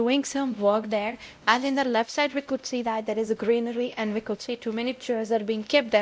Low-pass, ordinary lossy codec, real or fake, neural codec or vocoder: none; none; fake; codec, 16 kHz, 0.5 kbps, X-Codec, WavLM features, trained on Multilingual LibriSpeech